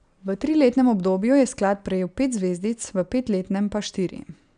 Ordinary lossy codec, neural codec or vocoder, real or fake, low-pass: none; none; real; 9.9 kHz